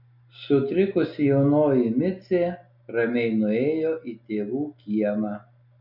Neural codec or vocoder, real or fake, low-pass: none; real; 5.4 kHz